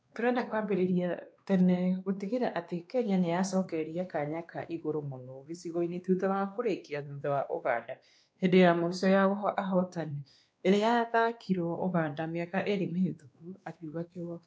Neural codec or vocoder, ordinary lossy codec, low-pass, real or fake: codec, 16 kHz, 2 kbps, X-Codec, WavLM features, trained on Multilingual LibriSpeech; none; none; fake